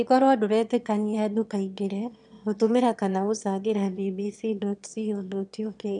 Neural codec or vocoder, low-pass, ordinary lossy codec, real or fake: autoencoder, 22.05 kHz, a latent of 192 numbers a frame, VITS, trained on one speaker; 9.9 kHz; none; fake